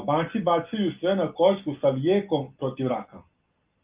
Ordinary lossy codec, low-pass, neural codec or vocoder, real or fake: Opus, 32 kbps; 3.6 kHz; none; real